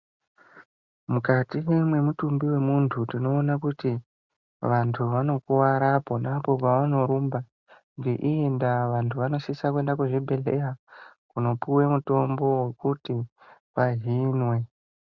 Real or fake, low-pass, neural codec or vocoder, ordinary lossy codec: real; 7.2 kHz; none; Opus, 64 kbps